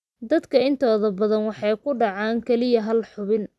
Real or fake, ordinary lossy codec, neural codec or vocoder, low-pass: real; none; none; none